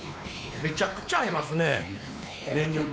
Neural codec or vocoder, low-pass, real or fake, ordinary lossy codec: codec, 16 kHz, 2 kbps, X-Codec, WavLM features, trained on Multilingual LibriSpeech; none; fake; none